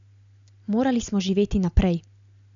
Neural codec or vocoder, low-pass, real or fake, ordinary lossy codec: none; 7.2 kHz; real; none